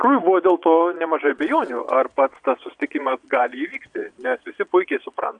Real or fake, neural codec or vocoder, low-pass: fake; vocoder, 22.05 kHz, 80 mel bands, Vocos; 9.9 kHz